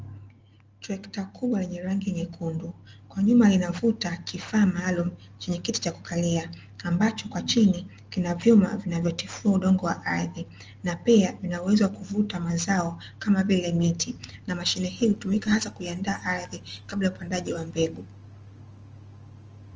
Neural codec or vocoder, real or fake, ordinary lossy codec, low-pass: none; real; Opus, 24 kbps; 7.2 kHz